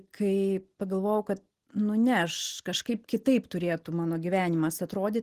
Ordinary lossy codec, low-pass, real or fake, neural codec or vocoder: Opus, 16 kbps; 14.4 kHz; real; none